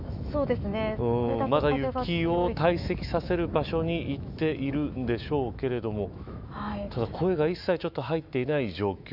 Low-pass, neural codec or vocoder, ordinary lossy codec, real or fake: 5.4 kHz; autoencoder, 48 kHz, 128 numbers a frame, DAC-VAE, trained on Japanese speech; none; fake